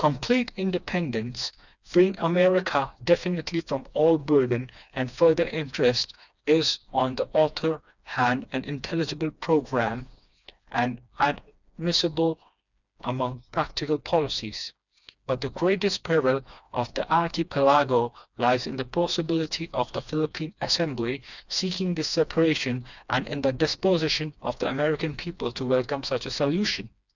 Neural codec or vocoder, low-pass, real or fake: codec, 16 kHz, 2 kbps, FreqCodec, smaller model; 7.2 kHz; fake